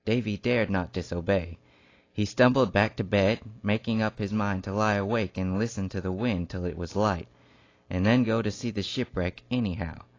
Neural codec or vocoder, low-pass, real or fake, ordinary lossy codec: none; 7.2 kHz; real; AAC, 32 kbps